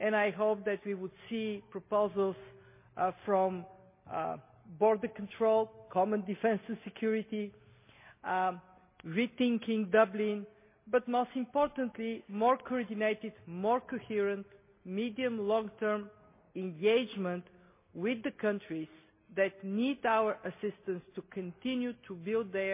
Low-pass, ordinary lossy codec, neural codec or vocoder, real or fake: 3.6 kHz; MP3, 24 kbps; none; real